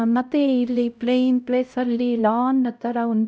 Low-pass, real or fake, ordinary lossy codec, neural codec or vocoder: none; fake; none; codec, 16 kHz, 0.5 kbps, X-Codec, HuBERT features, trained on LibriSpeech